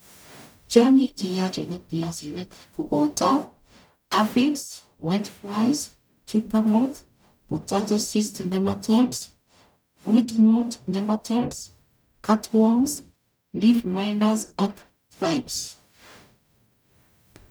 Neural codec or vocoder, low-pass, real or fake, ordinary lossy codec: codec, 44.1 kHz, 0.9 kbps, DAC; none; fake; none